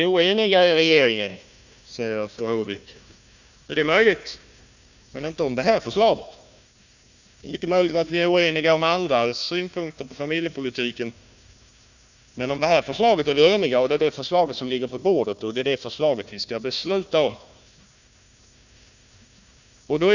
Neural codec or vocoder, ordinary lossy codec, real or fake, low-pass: codec, 16 kHz, 1 kbps, FunCodec, trained on Chinese and English, 50 frames a second; none; fake; 7.2 kHz